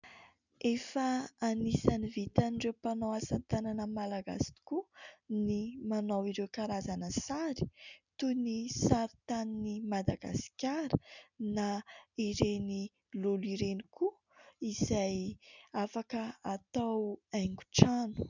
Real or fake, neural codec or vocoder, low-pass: real; none; 7.2 kHz